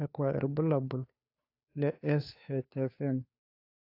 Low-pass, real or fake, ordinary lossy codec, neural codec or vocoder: 5.4 kHz; fake; AAC, 32 kbps; codec, 16 kHz, 2 kbps, FunCodec, trained on Chinese and English, 25 frames a second